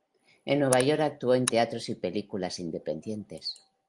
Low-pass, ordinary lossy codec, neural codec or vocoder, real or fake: 10.8 kHz; Opus, 32 kbps; none; real